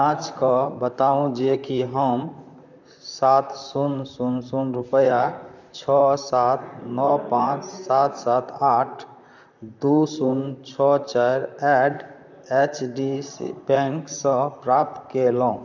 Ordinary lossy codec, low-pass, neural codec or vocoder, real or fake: none; 7.2 kHz; vocoder, 44.1 kHz, 128 mel bands, Pupu-Vocoder; fake